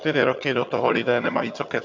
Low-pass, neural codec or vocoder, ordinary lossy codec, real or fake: 7.2 kHz; vocoder, 22.05 kHz, 80 mel bands, HiFi-GAN; MP3, 48 kbps; fake